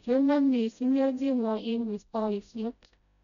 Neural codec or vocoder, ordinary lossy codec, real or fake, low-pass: codec, 16 kHz, 0.5 kbps, FreqCodec, smaller model; none; fake; 7.2 kHz